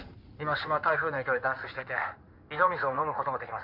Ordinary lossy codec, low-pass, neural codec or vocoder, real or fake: MP3, 48 kbps; 5.4 kHz; codec, 16 kHz in and 24 kHz out, 2.2 kbps, FireRedTTS-2 codec; fake